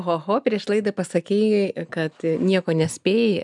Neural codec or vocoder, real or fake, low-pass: none; real; 10.8 kHz